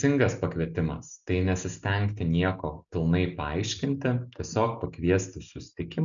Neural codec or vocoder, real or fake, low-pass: none; real; 7.2 kHz